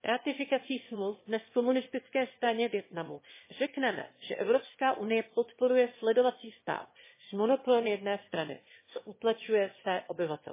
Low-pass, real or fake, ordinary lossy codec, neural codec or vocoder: 3.6 kHz; fake; MP3, 16 kbps; autoencoder, 22.05 kHz, a latent of 192 numbers a frame, VITS, trained on one speaker